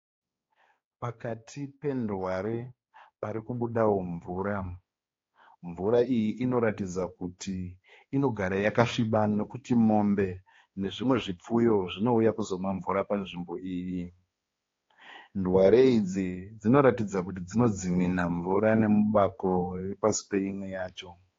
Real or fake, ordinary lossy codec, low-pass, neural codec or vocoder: fake; AAC, 32 kbps; 7.2 kHz; codec, 16 kHz, 2 kbps, X-Codec, HuBERT features, trained on balanced general audio